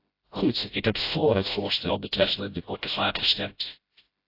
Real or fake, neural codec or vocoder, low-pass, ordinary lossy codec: fake; codec, 16 kHz, 0.5 kbps, FreqCodec, smaller model; 5.4 kHz; AAC, 32 kbps